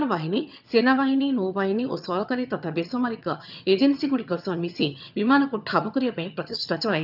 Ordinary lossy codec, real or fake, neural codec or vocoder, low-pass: none; fake; vocoder, 22.05 kHz, 80 mel bands, HiFi-GAN; 5.4 kHz